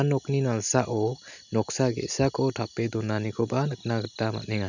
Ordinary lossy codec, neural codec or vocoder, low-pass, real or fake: none; none; 7.2 kHz; real